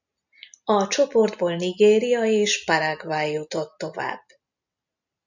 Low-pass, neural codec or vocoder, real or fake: 7.2 kHz; none; real